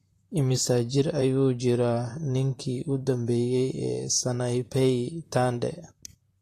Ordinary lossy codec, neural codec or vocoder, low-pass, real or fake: AAC, 48 kbps; vocoder, 44.1 kHz, 128 mel bands, Pupu-Vocoder; 14.4 kHz; fake